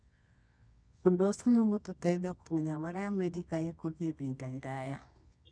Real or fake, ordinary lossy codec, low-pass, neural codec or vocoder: fake; none; 9.9 kHz; codec, 24 kHz, 0.9 kbps, WavTokenizer, medium music audio release